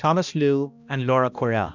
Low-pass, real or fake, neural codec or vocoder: 7.2 kHz; fake; codec, 16 kHz, 1 kbps, X-Codec, HuBERT features, trained on balanced general audio